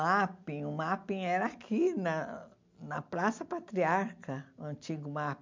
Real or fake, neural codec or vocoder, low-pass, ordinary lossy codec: real; none; 7.2 kHz; MP3, 64 kbps